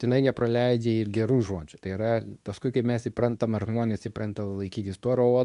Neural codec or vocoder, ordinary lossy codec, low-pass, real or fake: codec, 24 kHz, 0.9 kbps, WavTokenizer, medium speech release version 2; AAC, 96 kbps; 10.8 kHz; fake